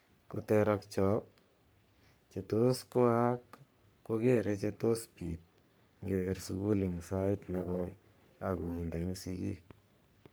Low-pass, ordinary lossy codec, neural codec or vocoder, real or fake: none; none; codec, 44.1 kHz, 3.4 kbps, Pupu-Codec; fake